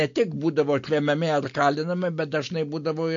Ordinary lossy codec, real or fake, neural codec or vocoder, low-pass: MP3, 48 kbps; real; none; 7.2 kHz